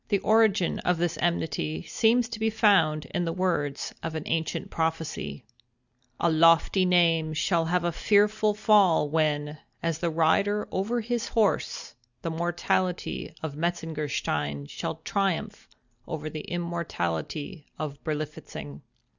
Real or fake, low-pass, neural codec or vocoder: real; 7.2 kHz; none